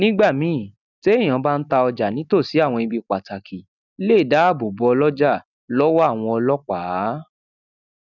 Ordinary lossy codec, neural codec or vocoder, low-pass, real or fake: none; none; 7.2 kHz; real